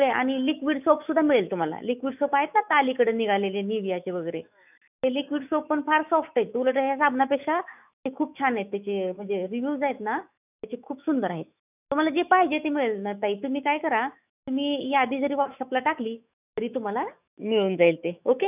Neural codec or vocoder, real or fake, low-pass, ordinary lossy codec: autoencoder, 48 kHz, 128 numbers a frame, DAC-VAE, trained on Japanese speech; fake; 3.6 kHz; none